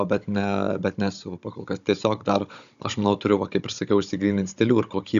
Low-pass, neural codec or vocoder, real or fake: 7.2 kHz; codec, 16 kHz, 16 kbps, FunCodec, trained on Chinese and English, 50 frames a second; fake